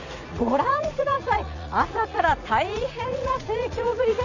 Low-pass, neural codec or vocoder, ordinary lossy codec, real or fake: 7.2 kHz; vocoder, 44.1 kHz, 80 mel bands, Vocos; none; fake